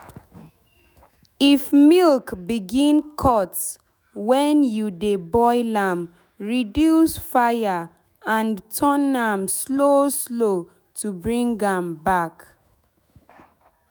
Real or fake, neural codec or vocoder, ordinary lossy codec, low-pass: fake; autoencoder, 48 kHz, 128 numbers a frame, DAC-VAE, trained on Japanese speech; none; none